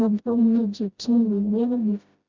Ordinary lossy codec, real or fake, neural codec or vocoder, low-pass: Opus, 64 kbps; fake; codec, 16 kHz, 0.5 kbps, FreqCodec, smaller model; 7.2 kHz